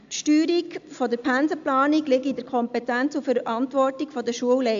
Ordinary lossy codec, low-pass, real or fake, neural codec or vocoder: AAC, 64 kbps; 7.2 kHz; real; none